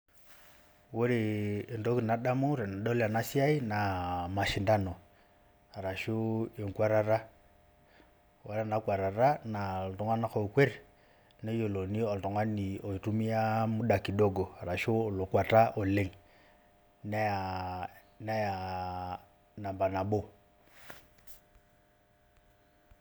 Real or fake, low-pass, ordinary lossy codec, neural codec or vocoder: real; none; none; none